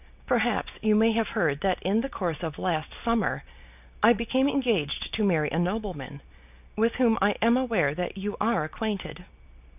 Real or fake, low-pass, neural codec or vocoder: real; 3.6 kHz; none